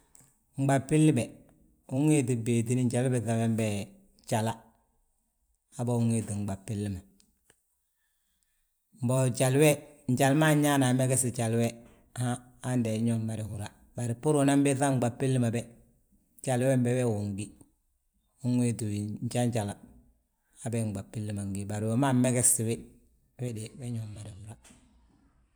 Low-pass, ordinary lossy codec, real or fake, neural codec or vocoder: none; none; fake; vocoder, 44.1 kHz, 128 mel bands every 256 samples, BigVGAN v2